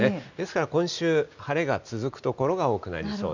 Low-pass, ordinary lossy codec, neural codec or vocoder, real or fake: 7.2 kHz; none; none; real